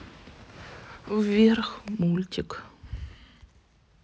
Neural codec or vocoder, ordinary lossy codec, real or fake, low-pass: none; none; real; none